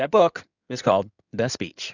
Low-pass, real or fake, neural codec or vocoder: 7.2 kHz; fake; codec, 16 kHz in and 24 kHz out, 2.2 kbps, FireRedTTS-2 codec